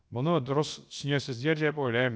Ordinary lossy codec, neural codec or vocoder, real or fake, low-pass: none; codec, 16 kHz, about 1 kbps, DyCAST, with the encoder's durations; fake; none